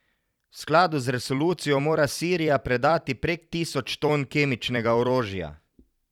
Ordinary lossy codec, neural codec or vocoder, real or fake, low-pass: none; vocoder, 44.1 kHz, 128 mel bands every 256 samples, BigVGAN v2; fake; 19.8 kHz